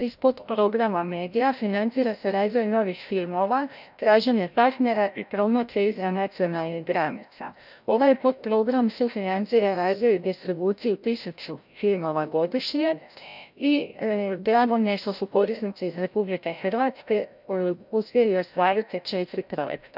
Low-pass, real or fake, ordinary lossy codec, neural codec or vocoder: 5.4 kHz; fake; none; codec, 16 kHz, 0.5 kbps, FreqCodec, larger model